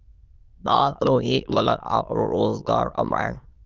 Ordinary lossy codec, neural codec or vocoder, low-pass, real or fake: Opus, 24 kbps; autoencoder, 22.05 kHz, a latent of 192 numbers a frame, VITS, trained on many speakers; 7.2 kHz; fake